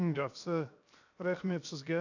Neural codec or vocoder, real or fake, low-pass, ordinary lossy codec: codec, 16 kHz, 0.7 kbps, FocalCodec; fake; 7.2 kHz; none